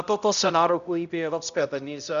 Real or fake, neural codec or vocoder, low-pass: fake; codec, 16 kHz, 0.5 kbps, X-Codec, HuBERT features, trained on balanced general audio; 7.2 kHz